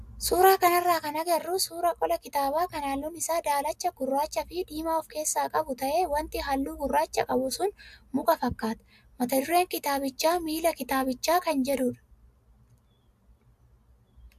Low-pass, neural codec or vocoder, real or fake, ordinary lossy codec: 14.4 kHz; none; real; AAC, 96 kbps